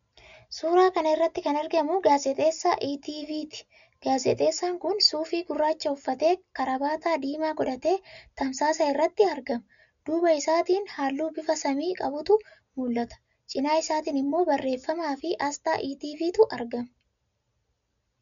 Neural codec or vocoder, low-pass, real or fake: none; 7.2 kHz; real